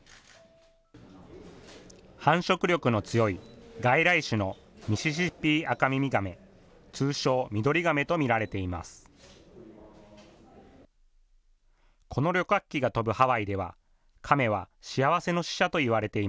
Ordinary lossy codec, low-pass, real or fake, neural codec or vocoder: none; none; real; none